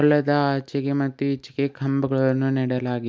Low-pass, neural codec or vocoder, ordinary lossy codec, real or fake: none; none; none; real